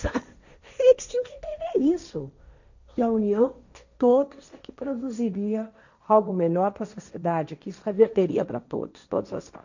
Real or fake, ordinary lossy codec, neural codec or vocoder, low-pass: fake; none; codec, 16 kHz, 1.1 kbps, Voila-Tokenizer; 7.2 kHz